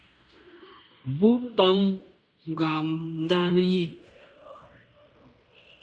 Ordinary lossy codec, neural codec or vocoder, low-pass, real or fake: Opus, 64 kbps; codec, 16 kHz in and 24 kHz out, 0.9 kbps, LongCat-Audio-Codec, fine tuned four codebook decoder; 9.9 kHz; fake